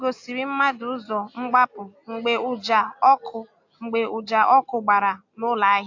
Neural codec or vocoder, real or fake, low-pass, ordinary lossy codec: none; real; 7.2 kHz; AAC, 48 kbps